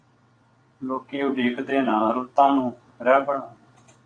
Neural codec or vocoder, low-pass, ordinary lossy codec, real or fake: vocoder, 22.05 kHz, 80 mel bands, WaveNeXt; 9.9 kHz; AAC, 48 kbps; fake